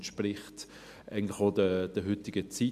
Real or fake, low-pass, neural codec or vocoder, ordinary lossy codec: real; 14.4 kHz; none; MP3, 96 kbps